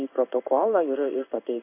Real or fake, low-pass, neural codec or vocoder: fake; 3.6 kHz; codec, 16 kHz in and 24 kHz out, 1 kbps, XY-Tokenizer